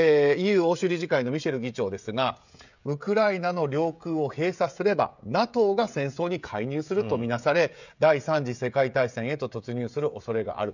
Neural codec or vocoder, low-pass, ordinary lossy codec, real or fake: codec, 16 kHz, 16 kbps, FreqCodec, smaller model; 7.2 kHz; none; fake